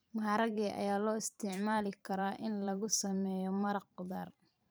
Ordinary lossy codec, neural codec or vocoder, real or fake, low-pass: none; vocoder, 44.1 kHz, 128 mel bands every 512 samples, BigVGAN v2; fake; none